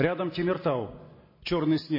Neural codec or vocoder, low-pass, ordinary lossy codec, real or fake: none; 5.4 kHz; MP3, 24 kbps; real